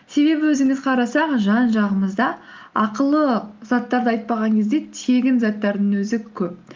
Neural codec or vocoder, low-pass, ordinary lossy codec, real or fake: none; 7.2 kHz; Opus, 32 kbps; real